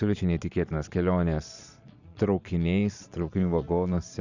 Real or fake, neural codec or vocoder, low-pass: fake; vocoder, 22.05 kHz, 80 mel bands, Vocos; 7.2 kHz